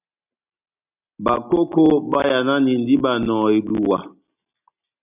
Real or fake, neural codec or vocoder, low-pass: real; none; 3.6 kHz